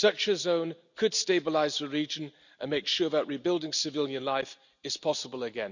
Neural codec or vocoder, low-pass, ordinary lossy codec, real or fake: none; 7.2 kHz; none; real